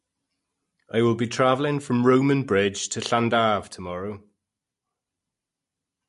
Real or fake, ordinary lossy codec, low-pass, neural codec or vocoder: real; MP3, 48 kbps; 14.4 kHz; none